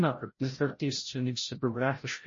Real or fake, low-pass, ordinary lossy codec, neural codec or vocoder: fake; 7.2 kHz; MP3, 32 kbps; codec, 16 kHz, 0.5 kbps, FreqCodec, larger model